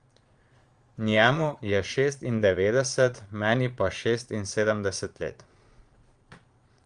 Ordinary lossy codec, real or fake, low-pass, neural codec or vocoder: Opus, 32 kbps; fake; 9.9 kHz; vocoder, 22.05 kHz, 80 mel bands, Vocos